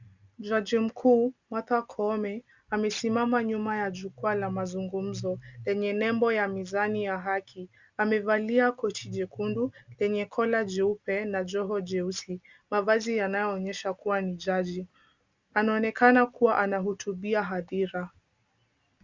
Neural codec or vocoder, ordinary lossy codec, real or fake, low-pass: none; Opus, 64 kbps; real; 7.2 kHz